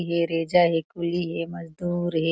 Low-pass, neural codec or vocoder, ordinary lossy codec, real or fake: none; none; none; real